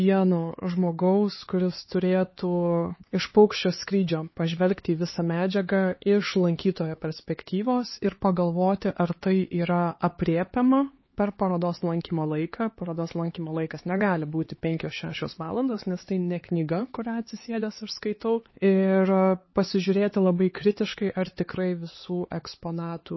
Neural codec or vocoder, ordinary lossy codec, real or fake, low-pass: codec, 16 kHz, 4 kbps, X-Codec, HuBERT features, trained on LibriSpeech; MP3, 24 kbps; fake; 7.2 kHz